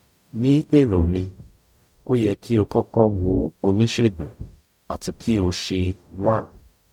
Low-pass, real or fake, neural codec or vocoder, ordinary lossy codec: 19.8 kHz; fake; codec, 44.1 kHz, 0.9 kbps, DAC; none